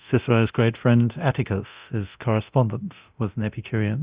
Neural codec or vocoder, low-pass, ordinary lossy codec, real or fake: codec, 24 kHz, 0.9 kbps, DualCodec; 3.6 kHz; Opus, 64 kbps; fake